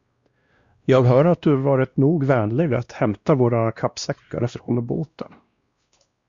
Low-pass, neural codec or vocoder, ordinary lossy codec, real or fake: 7.2 kHz; codec, 16 kHz, 1 kbps, X-Codec, WavLM features, trained on Multilingual LibriSpeech; Opus, 64 kbps; fake